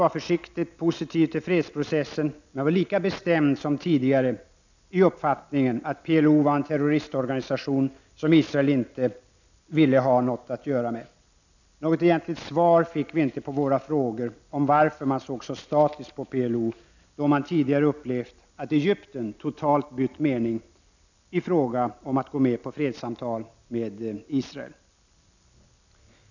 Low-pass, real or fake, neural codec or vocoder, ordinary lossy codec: 7.2 kHz; real; none; none